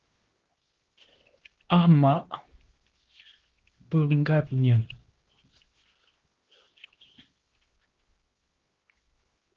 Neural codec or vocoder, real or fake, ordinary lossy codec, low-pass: codec, 16 kHz, 1 kbps, X-Codec, HuBERT features, trained on LibriSpeech; fake; Opus, 16 kbps; 7.2 kHz